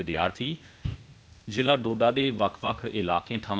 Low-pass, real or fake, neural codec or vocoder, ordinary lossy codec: none; fake; codec, 16 kHz, 0.8 kbps, ZipCodec; none